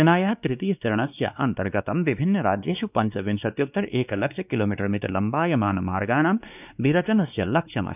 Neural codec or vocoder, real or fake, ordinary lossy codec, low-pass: codec, 16 kHz, 2 kbps, X-Codec, WavLM features, trained on Multilingual LibriSpeech; fake; none; 3.6 kHz